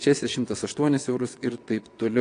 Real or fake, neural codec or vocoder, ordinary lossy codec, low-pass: fake; vocoder, 22.05 kHz, 80 mel bands, WaveNeXt; AAC, 48 kbps; 9.9 kHz